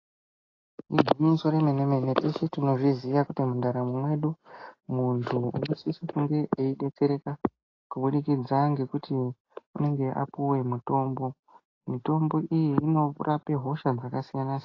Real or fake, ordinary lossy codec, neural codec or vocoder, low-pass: real; AAC, 32 kbps; none; 7.2 kHz